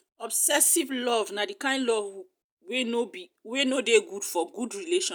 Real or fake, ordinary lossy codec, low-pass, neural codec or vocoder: real; none; none; none